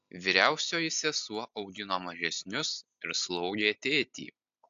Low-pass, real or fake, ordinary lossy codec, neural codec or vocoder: 7.2 kHz; real; AAC, 64 kbps; none